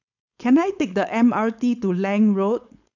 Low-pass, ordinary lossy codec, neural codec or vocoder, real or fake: 7.2 kHz; none; codec, 16 kHz, 4.8 kbps, FACodec; fake